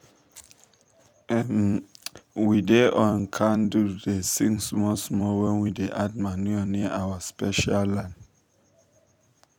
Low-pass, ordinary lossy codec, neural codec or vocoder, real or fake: 19.8 kHz; none; vocoder, 44.1 kHz, 128 mel bands every 256 samples, BigVGAN v2; fake